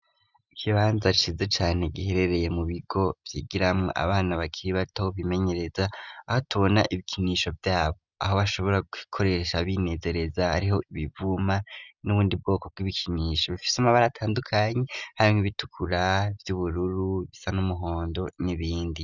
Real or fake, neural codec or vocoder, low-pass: real; none; 7.2 kHz